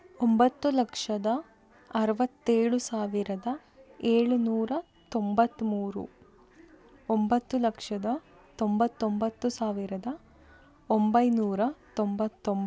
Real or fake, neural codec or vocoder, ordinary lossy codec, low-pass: real; none; none; none